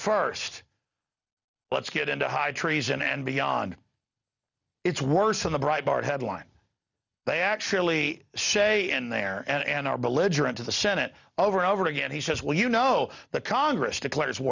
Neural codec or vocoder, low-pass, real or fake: none; 7.2 kHz; real